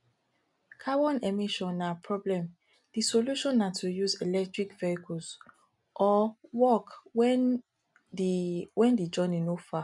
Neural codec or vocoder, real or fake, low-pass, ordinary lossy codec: none; real; 10.8 kHz; none